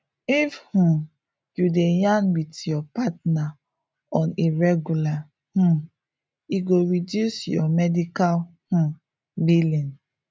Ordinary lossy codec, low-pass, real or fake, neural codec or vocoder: none; none; real; none